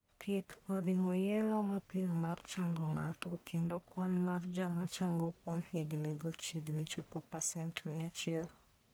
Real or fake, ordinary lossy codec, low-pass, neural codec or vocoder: fake; none; none; codec, 44.1 kHz, 1.7 kbps, Pupu-Codec